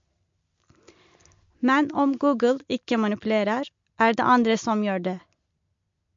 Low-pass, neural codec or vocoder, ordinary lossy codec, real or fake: 7.2 kHz; none; MP3, 48 kbps; real